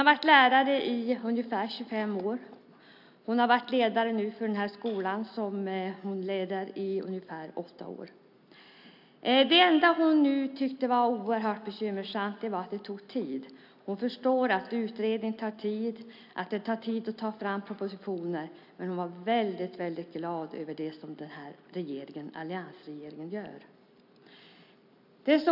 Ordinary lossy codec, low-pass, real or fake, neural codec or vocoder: AAC, 48 kbps; 5.4 kHz; real; none